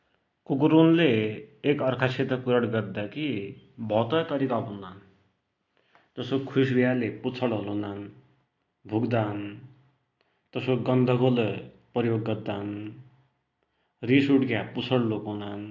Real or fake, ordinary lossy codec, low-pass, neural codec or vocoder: real; AAC, 48 kbps; 7.2 kHz; none